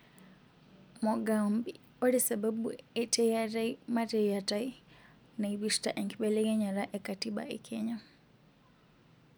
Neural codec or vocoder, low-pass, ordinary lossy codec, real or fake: none; none; none; real